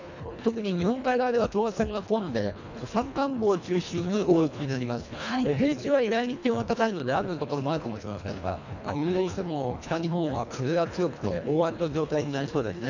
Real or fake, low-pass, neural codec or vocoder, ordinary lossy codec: fake; 7.2 kHz; codec, 24 kHz, 1.5 kbps, HILCodec; none